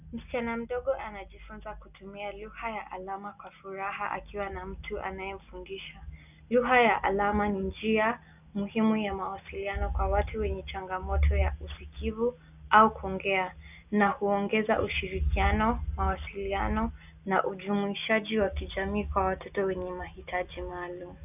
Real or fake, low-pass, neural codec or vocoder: real; 3.6 kHz; none